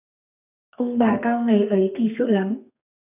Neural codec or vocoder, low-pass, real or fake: codec, 32 kHz, 1.9 kbps, SNAC; 3.6 kHz; fake